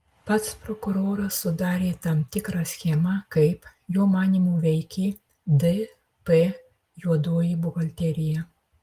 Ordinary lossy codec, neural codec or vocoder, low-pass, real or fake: Opus, 24 kbps; none; 14.4 kHz; real